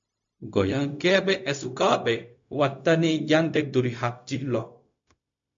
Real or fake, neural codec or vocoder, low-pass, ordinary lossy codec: fake; codec, 16 kHz, 0.4 kbps, LongCat-Audio-Codec; 7.2 kHz; MP3, 48 kbps